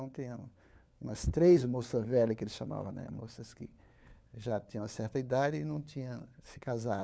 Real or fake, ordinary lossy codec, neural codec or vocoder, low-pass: fake; none; codec, 16 kHz, 4 kbps, FunCodec, trained on LibriTTS, 50 frames a second; none